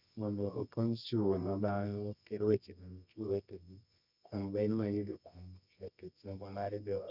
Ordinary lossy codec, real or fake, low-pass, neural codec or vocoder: none; fake; 5.4 kHz; codec, 24 kHz, 0.9 kbps, WavTokenizer, medium music audio release